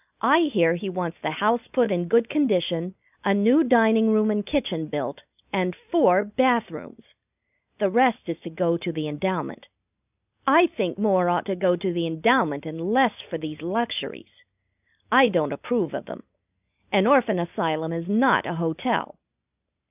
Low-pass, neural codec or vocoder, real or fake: 3.6 kHz; none; real